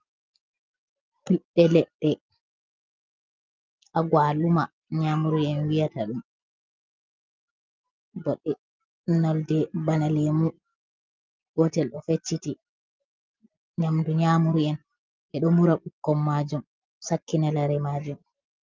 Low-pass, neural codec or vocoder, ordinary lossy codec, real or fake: 7.2 kHz; none; Opus, 24 kbps; real